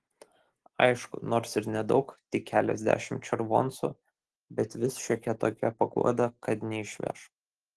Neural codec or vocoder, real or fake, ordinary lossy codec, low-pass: none; real; Opus, 16 kbps; 10.8 kHz